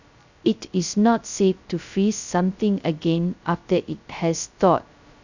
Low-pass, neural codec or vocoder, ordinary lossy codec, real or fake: 7.2 kHz; codec, 16 kHz, 0.2 kbps, FocalCodec; none; fake